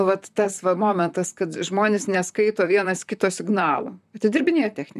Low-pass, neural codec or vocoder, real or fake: 14.4 kHz; vocoder, 44.1 kHz, 128 mel bands, Pupu-Vocoder; fake